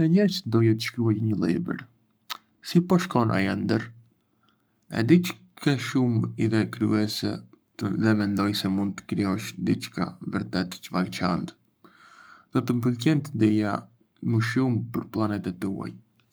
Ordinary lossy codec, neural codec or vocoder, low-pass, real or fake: none; codec, 44.1 kHz, 7.8 kbps, Pupu-Codec; none; fake